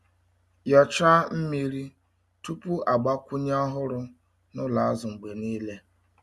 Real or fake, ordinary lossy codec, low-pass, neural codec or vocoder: real; none; none; none